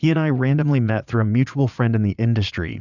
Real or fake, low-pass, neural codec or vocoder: fake; 7.2 kHz; vocoder, 22.05 kHz, 80 mel bands, Vocos